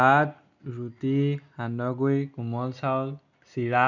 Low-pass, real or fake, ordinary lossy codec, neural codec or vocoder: 7.2 kHz; real; none; none